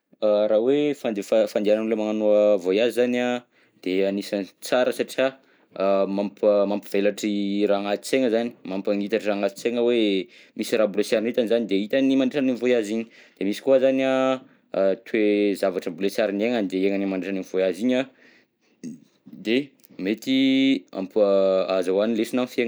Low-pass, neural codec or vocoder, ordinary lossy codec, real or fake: none; none; none; real